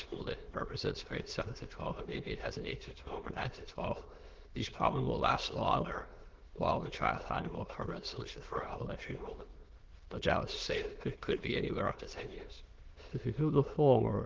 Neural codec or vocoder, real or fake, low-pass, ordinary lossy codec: autoencoder, 22.05 kHz, a latent of 192 numbers a frame, VITS, trained on many speakers; fake; 7.2 kHz; Opus, 16 kbps